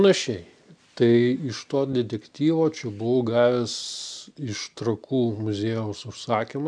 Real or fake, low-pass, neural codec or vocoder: real; 9.9 kHz; none